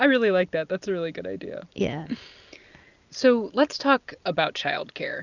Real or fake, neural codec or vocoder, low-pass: real; none; 7.2 kHz